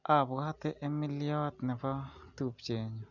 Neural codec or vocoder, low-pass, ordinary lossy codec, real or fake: none; 7.2 kHz; none; real